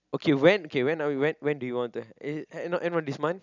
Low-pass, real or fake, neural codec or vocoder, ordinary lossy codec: 7.2 kHz; real; none; none